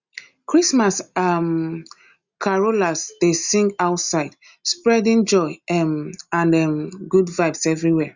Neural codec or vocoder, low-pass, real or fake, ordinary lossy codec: none; 7.2 kHz; real; Opus, 64 kbps